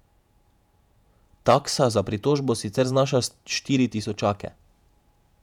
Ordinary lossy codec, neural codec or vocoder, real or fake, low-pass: none; vocoder, 48 kHz, 128 mel bands, Vocos; fake; 19.8 kHz